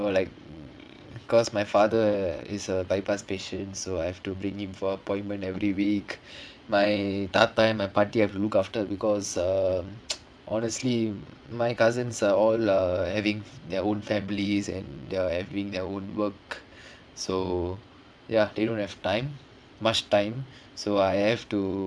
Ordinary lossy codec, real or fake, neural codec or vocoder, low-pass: none; fake; vocoder, 22.05 kHz, 80 mel bands, WaveNeXt; none